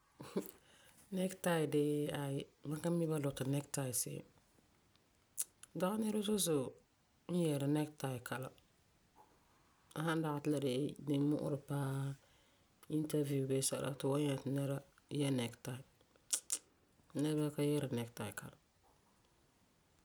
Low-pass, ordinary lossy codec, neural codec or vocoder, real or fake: none; none; none; real